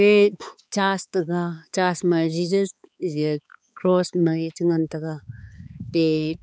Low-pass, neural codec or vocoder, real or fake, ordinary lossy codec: none; codec, 16 kHz, 4 kbps, X-Codec, HuBERT features, trained on balanced general audio; fake; none